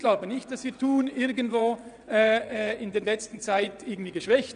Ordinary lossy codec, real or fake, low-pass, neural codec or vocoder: none; fake; 9.9 kHz; vocoder, 22.05 kHz, 80 mel bands, WaveNeXt